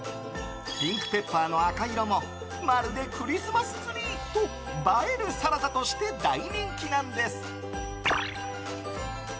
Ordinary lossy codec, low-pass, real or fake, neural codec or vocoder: none; none; real; none